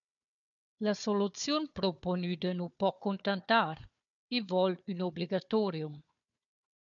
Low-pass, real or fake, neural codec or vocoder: 7.2 kHz; fake; codec, 16 kHz, 4 kbps, FunCodec, trained on Chinese and English, 50 frames a second